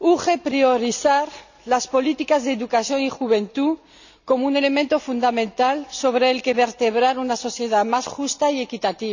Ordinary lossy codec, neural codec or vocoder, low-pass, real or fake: none; none; 7.2 kHz; real